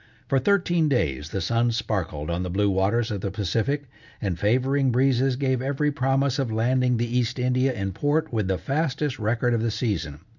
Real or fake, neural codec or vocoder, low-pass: real; none; 7.2 kHz